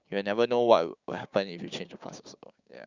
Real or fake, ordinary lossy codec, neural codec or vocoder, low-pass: fake; Opus, 64 kbps; codec, 16 kHz, 6 kbps, DAC; 7.2 kHz